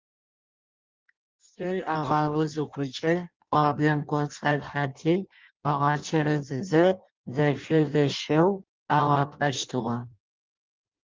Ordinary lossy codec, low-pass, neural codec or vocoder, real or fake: Opus, 32 kbps; 7.2 kHz; codec, 16 kHz in and 24 kHz out, 0.6 kbps, FireRedTTS-2 codec; fake